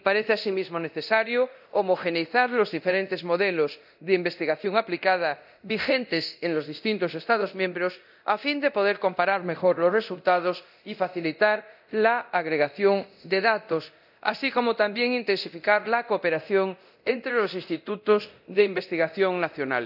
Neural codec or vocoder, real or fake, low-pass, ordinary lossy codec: codec, 24 kHz, 0.9 kbps, DualCodec; fake; 5.4 kHz; none